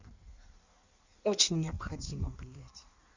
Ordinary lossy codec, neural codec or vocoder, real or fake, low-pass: Opus, 64 kbps; codec, 16 kHz in and 24 kHz out, 1.1 kbps, FireRedTTS-2 codec; fake; 7.2 kHz